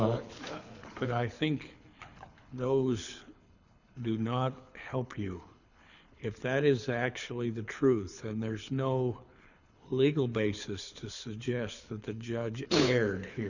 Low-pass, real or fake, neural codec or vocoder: 7.2 kHz; fake; codec, 24 kHz, 6 kbps, HILCodec